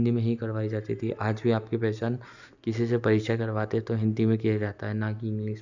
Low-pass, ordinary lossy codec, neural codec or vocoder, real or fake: 7.2 kHz; none; none; real